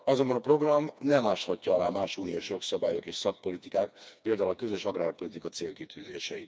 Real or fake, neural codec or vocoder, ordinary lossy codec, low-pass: fake; codec, 16 kHz, 2 kbps, FreqCodec, smaller model; none; none